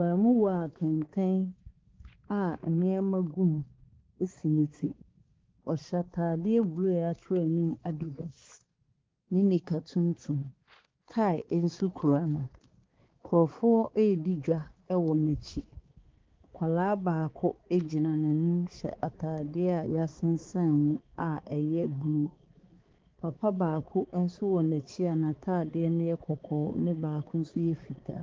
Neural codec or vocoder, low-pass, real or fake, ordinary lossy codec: codec, 16 kHz, 4 kbps, X-Codec, HuBERT features, trained on balanced general audio; 7.2 kHz; fake; Opus, 16 kbps